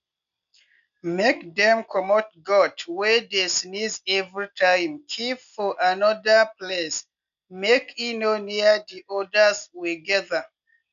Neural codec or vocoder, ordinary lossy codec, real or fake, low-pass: none; none; real; 7.2 kHz